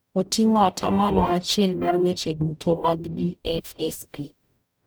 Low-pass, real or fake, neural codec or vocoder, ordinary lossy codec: none; fake; codec, 44.1 kHz, 0.9 kbps, DAC; none